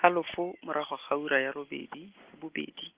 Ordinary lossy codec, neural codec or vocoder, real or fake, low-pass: Opus, 16 kbps; none; real; 3.6 kHz